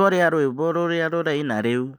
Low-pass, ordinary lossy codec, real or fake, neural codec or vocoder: none; none; real; none